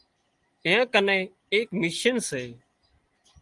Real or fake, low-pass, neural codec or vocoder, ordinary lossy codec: real; 10.8 kHz; none; Opus, 24 kbps